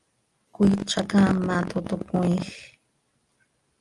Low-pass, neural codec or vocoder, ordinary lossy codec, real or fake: 10.8 kHz; none; Opus, 32 kbps; real